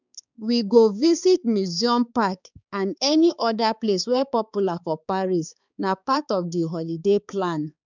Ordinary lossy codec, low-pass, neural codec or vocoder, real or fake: none; 7.2 kHz; codec, 16 kHz, 4 kbps, X-Codec, HuBERT features, trained on balanced general audio; fake